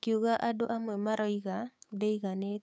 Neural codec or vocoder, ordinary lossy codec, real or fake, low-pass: codec, 16 kHz, 6 kbps, DAC; none; fake; none